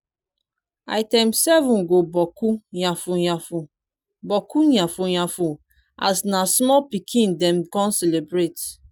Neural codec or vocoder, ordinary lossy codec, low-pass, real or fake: none; none; none; real